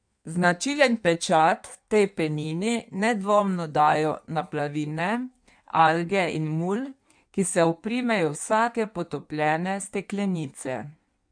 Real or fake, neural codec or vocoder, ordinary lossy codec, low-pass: fake; codec, 16 kHz in and 24 kHz out, 1.1 kbps, FireRedTTS-2 codec; none; 9.9 kHz